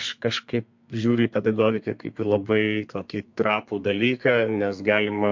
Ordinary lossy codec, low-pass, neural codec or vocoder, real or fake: MP3, 48 kbps; 7.2 kHz; codec, 32 kHz, 1.9 kbps, SNAC; fake